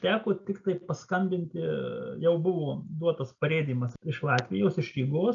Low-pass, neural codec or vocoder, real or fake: 7.2 kHz; none; real